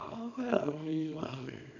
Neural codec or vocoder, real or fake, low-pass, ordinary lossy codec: codec, 24 kHz, 0.9 kbps, WavTokenizer, small release; fake; 7.2 kHz; none